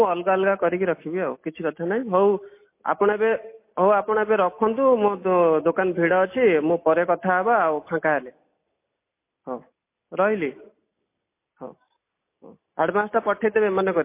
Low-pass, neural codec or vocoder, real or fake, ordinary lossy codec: 3.6 kHz; none; real; MP3, 24 kbps